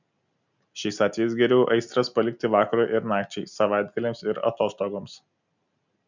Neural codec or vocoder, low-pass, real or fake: none; 7.2 kHz; real